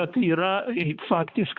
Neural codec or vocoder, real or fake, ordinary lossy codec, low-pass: codec, 16 kHz, 2 kbps, X-Codec, HuBERT features, trained on balanced general audio; fake; Opus, 64 kbps; 7.2 kHz